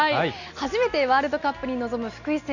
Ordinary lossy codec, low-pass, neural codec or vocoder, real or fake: none; 7.2 kHz; none; real